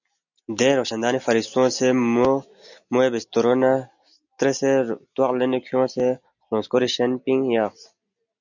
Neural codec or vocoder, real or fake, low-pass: none; real; 7.2 kHz